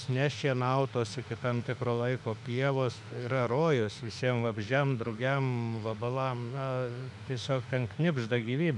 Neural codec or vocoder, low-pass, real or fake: autoencoder, 48 kHz, 32 numbers a frame, DAC-VAE, trained on Japanese speech; 10.8 kHz; fake